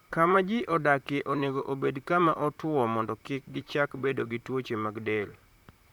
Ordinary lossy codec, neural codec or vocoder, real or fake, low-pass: none; vocoder, 44.1 kHz, 128 mel bands, Pupu-Vocoder; fake; 19.8 kHz